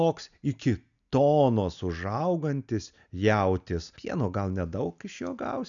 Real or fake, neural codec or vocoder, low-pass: real; none; 7.2 kHz